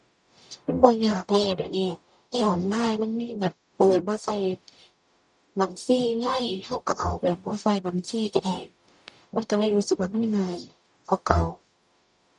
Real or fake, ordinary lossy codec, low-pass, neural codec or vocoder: fake; none; 10.8 kHz; codec, 44.1 kHz, 0.9 kbps, DAC